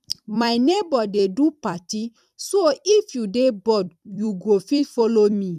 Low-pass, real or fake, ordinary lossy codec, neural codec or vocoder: 14.4 kHz; fake; none; vocoder, 44.1 kHz, 128 mel bands every 256 samples, BigVGAN v2